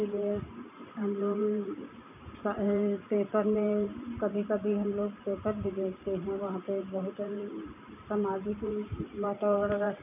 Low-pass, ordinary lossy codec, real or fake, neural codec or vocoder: 3.6 kHz; none; fake; vocoder, 44.1 kHz, 128 mel bands every 512 samples, BigVGAN v2